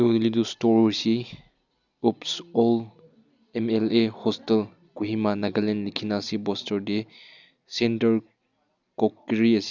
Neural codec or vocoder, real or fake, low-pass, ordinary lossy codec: none; real; 7.2 kHz; none